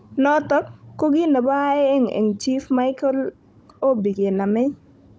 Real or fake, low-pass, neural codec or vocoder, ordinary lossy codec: fake; none; codec, 16 kHz, 16 kbps, FunCodec, trained on Chinese and English, 50 frames a second; none